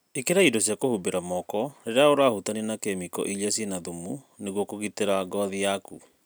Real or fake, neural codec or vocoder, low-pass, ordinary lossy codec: real; none; none; none